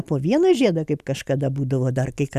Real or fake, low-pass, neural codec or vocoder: real; 14.4 kHz; none